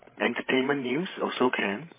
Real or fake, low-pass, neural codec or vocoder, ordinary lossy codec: fake; 3.6 kHz; codec, 16 kHz, 16 kbps, FreqCodec, larger model; MP3, 16 kbps